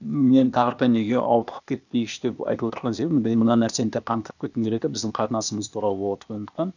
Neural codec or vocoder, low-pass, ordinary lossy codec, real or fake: codec, 16 kHz, 0.8 kbps, ZipCodec; 7.2 kHz; none; fake